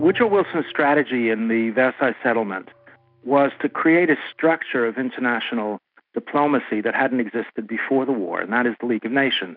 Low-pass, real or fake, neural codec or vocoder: 5.4 kHz; real; none